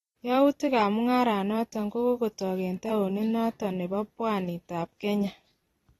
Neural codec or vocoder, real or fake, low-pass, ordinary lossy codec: vocoder, 44.1 kHz, 128 mel bands every 256 samples, BigVGAN v2; fake; 19.8 kHz; AAC, 32 kbps